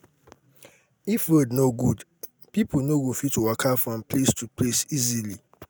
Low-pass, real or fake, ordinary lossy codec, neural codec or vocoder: none; real; none; none